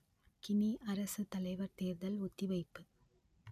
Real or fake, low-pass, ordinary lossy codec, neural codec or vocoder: real; 14.4 kHz; none; none